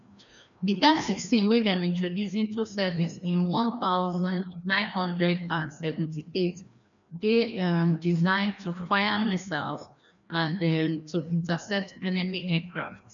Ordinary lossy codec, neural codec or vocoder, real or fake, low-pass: none; codec, 16 kHz, 1 kbps, FreqCodec, larger model; fake; 7.2 kHz